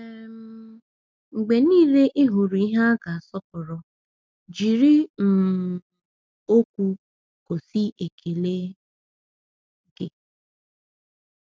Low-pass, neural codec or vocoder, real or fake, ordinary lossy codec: none; none; real; none